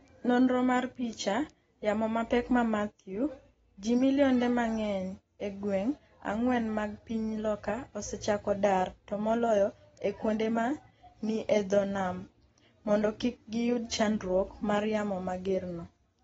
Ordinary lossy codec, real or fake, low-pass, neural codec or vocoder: AAC, 24 kbps; real; 19.8 kHz; none